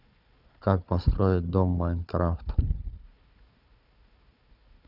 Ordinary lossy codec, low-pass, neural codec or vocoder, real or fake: none; 5.4 kHz; codec, 16 kHz, 4 kbps, FunCodec, trained on Chinese and English, 50 frames a second; fake